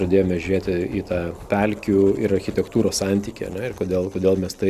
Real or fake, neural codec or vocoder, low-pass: real; none; 14.4 kHz